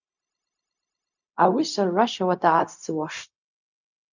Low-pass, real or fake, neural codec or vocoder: 7.2 kHz; fake; codec, 16 kHz, 0.4 kbps, LongCat-Audio-Codec